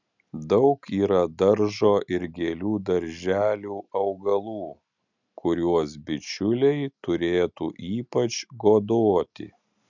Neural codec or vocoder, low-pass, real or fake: none; 7.2 kHz; real